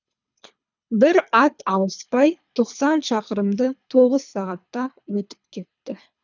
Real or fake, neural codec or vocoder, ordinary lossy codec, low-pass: fake; codec, 24 kHz, 3 kbps, HILCodec; none; 7.2 kHz